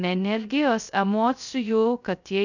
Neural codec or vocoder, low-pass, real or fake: codec, 16 kHz, 0.2 kbps, FocalCodec; 7.2 kHz; fake